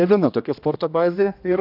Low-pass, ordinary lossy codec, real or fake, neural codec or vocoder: 5.4 kHz; MP3, 48 kbps; fake; codec, 16 kHz, 1 kbps, X-Codec, HuBERT features, trained on general audio